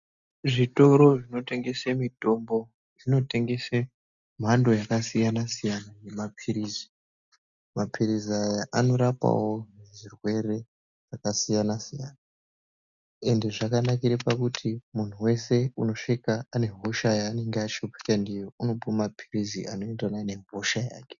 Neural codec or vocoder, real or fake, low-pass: none; real; 7.2 kHz